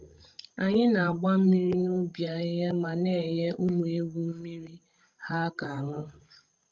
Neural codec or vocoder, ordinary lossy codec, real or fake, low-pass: codec, 16 kHz, 16 kbps, FreqCodec, larger model; Opus, 32 kbps; fake; 7.2 kHz